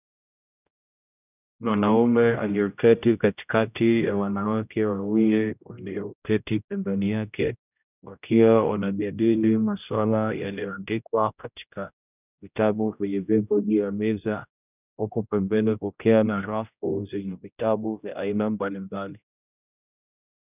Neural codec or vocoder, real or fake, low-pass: codec, 16 kHz, 0.5 kbps, X-Codec, HuBERT features, trained on general audio; fake; 3.6 kHz